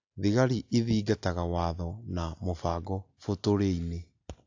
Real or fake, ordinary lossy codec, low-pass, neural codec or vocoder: real; AAC, 48 kbps; 7.2 kHz; none